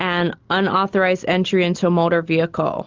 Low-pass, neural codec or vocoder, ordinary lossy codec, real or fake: 7.2 kHz; none; Opus, 32 kbps; real